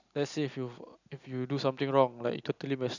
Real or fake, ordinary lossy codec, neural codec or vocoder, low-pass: real; none; none; 7.2 kHz